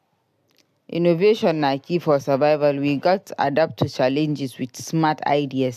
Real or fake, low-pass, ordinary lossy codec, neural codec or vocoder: real; 14.4 kHz; AAC, 96 kbps; none